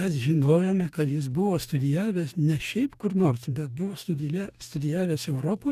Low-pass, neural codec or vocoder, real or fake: 14.4 kHz; codec, 44.1 kHz, 2.6 kbps, DAC; fake